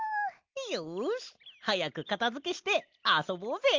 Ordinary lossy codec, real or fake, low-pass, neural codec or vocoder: Opus, 32 kbps; real; 7.2 kHz; none